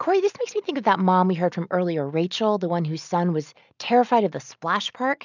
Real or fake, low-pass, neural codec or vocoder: real; 7.2 kHz; none